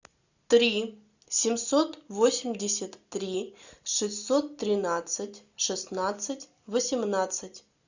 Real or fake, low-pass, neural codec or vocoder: real; 7.2 kHz; none